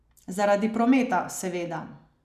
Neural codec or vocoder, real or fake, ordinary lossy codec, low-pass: none; real; none; 14.4 kHz